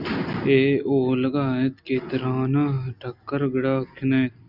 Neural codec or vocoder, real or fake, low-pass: none; real; 5.4 kHz